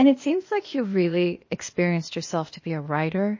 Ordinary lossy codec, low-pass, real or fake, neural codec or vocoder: MP3, 32 kbps; 7.2 kHz; fake; codec, 16 kHz, 0.8 kbps, ZipCodec